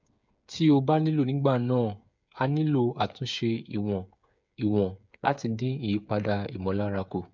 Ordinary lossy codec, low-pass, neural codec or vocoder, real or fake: MP3, 64 kbps; 7.2 kHz; codec, 16 kHz, 8 kbps, FreqCodec, smaller model; fake